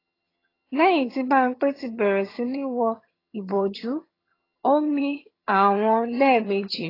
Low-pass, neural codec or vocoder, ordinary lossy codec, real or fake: 5.4 kHz; vocoder, 22.05 kHz, 80 mel bands, HiFi-GAN; AAC, 24 kbps; fake